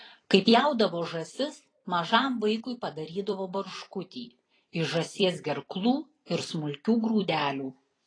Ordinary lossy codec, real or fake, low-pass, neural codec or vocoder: AAC, 32 kbps; fake; 9.9 kHz; vocoder, 44.1 kHz, 128 mel bands every 256 samples, BigVGAN v2